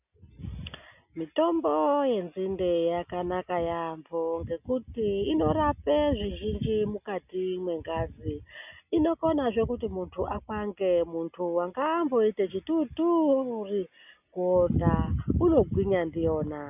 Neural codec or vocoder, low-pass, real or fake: none; 3.6 kHz; real